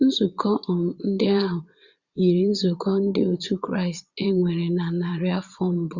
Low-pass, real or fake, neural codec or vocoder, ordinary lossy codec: 7.2 kHz; fake; vocoder, 22.05 kHz, 80 mel bands, Vocos; Opus, 64 kbps